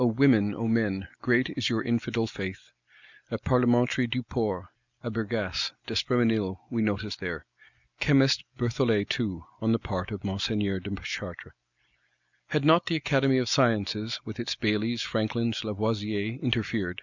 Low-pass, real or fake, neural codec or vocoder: 7.2 kHz; real; none